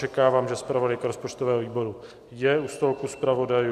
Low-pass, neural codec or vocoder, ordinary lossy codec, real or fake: 14.4 kHz; none; AAC, 96 kbps; real